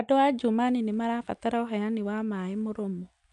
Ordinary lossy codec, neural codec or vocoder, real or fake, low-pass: none; none; real; 10.8 kHz